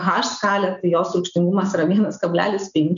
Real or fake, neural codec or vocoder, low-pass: real; none; 7.2 kHz